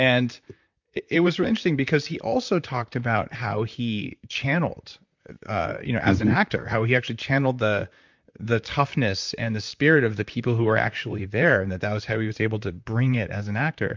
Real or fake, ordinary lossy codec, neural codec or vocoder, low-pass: fake; MP3, 64 kbps; vocoder, 44.1 kHz, 128 mel bands, Pupu-Vocoder; 7.2 kHz